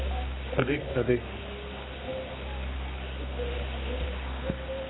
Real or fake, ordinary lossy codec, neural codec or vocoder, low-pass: fake; AAC, 16 kbps; codec, 24 kHz, 0.9 kbps, WavTokenizer, medium music audio release; 7.2 kHz